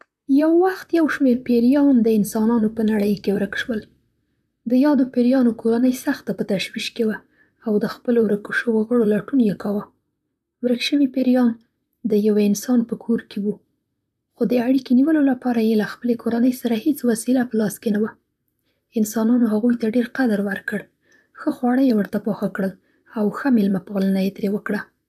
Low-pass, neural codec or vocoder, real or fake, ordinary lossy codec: 14.4 kHz; vocoder, 44.1 kHz, 128 mel bands, Pupu-Vocoder; fake; none